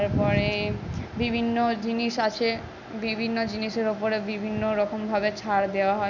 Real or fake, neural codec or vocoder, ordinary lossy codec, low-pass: real; none; none; 7.2 kHz